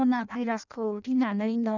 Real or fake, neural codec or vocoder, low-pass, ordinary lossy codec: fake; codec, 16 kHz in and 24 kHz out, 0.6 kbps, FireRedTTS-2 codec; 7.2 kHz; none